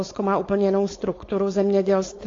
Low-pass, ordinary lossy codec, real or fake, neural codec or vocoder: 7.2 kHz; AAC, 32 kbps; fake; codec, 16 kHz, 4.8 kbps, FACodec